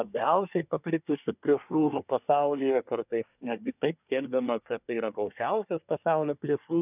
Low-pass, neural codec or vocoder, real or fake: 3.6 kHz; codec, 24 kHz, 1 kbps, SNAC; fake